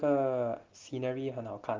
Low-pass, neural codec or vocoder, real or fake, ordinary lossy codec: 7.2 kHz; none; real; Opus, 16 kbps